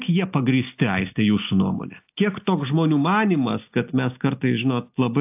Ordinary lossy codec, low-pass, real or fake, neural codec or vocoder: AAC, 32 kbps; 3.6 kHz; real; none